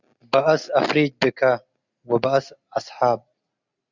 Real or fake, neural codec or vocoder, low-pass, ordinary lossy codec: real; none; 7.2 kHz; Opus, 64 kbps